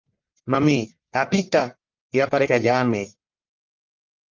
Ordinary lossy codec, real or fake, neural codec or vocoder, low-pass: Opus, 24 kbps; fake; codec, 44.1 kHz, 1.7 kbps, Pupu-Codec; 7.2 kHz